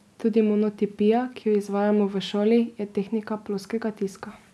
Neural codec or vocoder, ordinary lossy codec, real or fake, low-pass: none; none; real; none